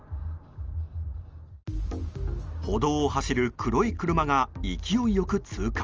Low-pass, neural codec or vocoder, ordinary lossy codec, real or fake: 7.2 kHz; none; Opus, 24 kbps; real